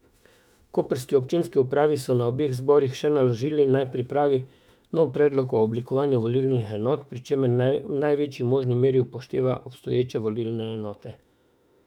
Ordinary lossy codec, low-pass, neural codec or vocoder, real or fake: none; 19.8 kHz; autoencoder, 48 kHz, 32 numbers a frame, DAC-VAE, trained on Japanese speech; fake